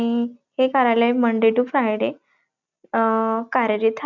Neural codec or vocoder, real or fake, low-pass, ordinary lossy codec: none; real; 7.2 kHz; none